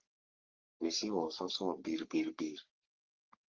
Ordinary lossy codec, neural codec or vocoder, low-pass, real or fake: Opus, 32 kbps; codec, 44.1 kHz, 3.4 kbps, Pupu-Codec; 7.2 kHz; fake